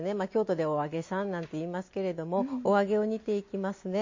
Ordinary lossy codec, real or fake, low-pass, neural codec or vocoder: MP3, 32 kbps; real; 7.2 kHz; none